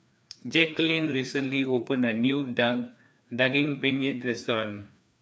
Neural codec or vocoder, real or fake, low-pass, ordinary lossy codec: codec, 16 kHz, 2 kbps, FreqCodec, larger model; fake; none; none